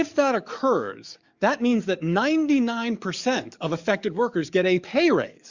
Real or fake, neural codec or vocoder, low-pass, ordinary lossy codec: fake; codec, 44.1 kHz, 7.8 kbps, DAC; 7.2 kHz; Opus, 64 kbps